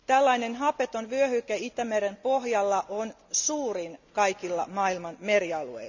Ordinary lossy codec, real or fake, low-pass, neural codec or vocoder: none; real; 7.2 kHz; none